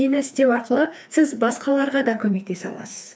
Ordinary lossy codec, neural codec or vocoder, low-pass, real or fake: none; codec, 16 kHz, 2 kbps, FreqCodec, larger model; none; fake